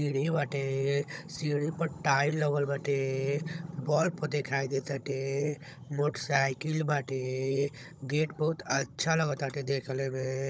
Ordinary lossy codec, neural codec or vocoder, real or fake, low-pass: none; codec, 16 kHz, 16 kbps, FunCodec, trained on Chinese and English, 50 frames a second; fake; none